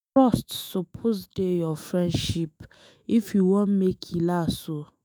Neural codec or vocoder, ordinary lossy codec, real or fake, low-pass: autoencoder, 48 kHz, 128 numbers a frame, DAC-VAE, trained on Japanese speech; none; fake; none